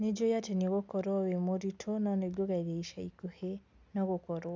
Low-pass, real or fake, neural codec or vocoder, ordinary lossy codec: none; real; none; none